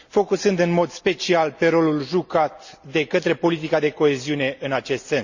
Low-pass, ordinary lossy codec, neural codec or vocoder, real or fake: 7.2 kHz; Opus, 64 kbps; none; real